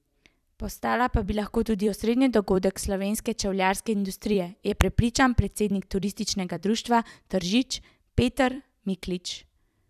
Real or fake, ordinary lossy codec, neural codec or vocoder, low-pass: fake; none; vocoder, 44.1 kHz, 128 mel bands every 256 samples, BigVGAN v2; 14.4 kHz